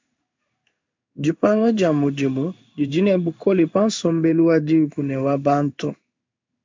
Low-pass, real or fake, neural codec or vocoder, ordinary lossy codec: 7.2 kHz; fake; codec, 16 kHz in and 24 kHz out, 1 kbps, XY-Tokenizer; MP3, 64 kbps